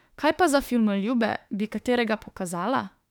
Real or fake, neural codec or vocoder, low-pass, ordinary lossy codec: fake; autoencoder, 48 kHz, 32 numbers a frame, DAC-VAE, trained on Japanese speech; 19.8 kHz; none